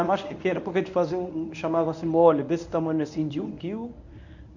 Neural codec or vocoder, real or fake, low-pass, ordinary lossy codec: codec, 24 kHz, 0.9 kbps, WavTokenizer, medium speech release version 1; fake; 7.2 kHz; none